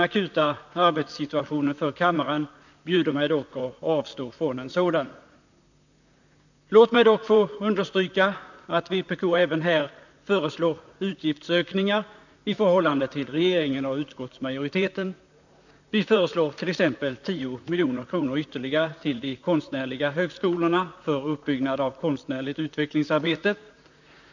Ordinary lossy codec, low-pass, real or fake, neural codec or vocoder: none; 7.2 kHz; fake; vocoder, 44.1 kHz, 128 mel bands, Pupu-Vocoder